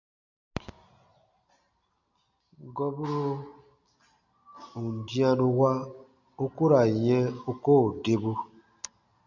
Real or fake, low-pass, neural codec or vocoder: real; 7.2 kHz; none